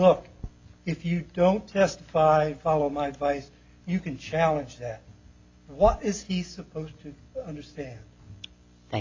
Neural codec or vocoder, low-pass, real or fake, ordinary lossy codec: none; 7.2 kHz; real; AAC, 48 kbps